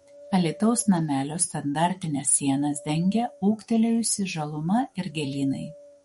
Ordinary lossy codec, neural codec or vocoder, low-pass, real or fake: MP3, 48 kbps; autoencoder, 48 kHz, 128 numbers a frame, DAC-VAE, trained on Japanese speech; 19.8 kHz; fake